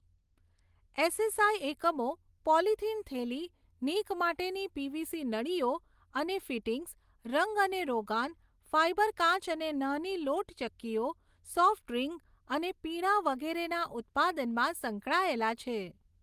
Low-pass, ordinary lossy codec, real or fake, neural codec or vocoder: 14.4 kHz; Opus, 24 kbps; real; none